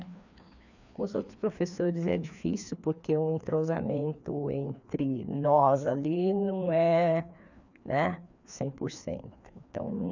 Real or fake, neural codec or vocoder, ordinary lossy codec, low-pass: fake; codec, 16 kHz, 2 kbps, FreqCodec, larger model; none; 7.2 kHz